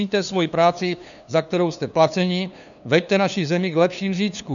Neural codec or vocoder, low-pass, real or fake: codec, 16 kHz, 2 kbps, FunCodec, trained on LibriTTS, 25 frames a second; 7.2 kHz; fake